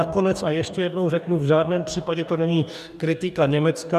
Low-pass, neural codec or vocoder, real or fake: 14.4 kHz; codec, 44.1 kHz, 2.6 kbps, DAC; fake